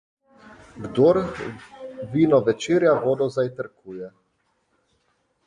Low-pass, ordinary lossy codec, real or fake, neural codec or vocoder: 9.9 kHz; MP3, 96 kbps; real; none